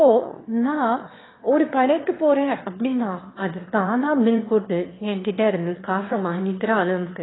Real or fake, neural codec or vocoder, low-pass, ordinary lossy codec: fake; autoencoder, 22.05 kHz, a latent of 192 numbers a frame, VITS, trained on one speaker; 7.2 kHz; AAC, 16 kbps